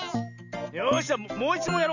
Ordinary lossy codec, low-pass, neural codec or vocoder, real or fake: none; 7.2 kHz; none; real